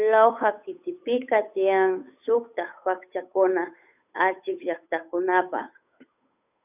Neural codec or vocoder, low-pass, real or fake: codec, 16 kHz, 8 kbps, FunCodec, trained on Chinese and English, 25 frames a second; 3.6 kHz; fake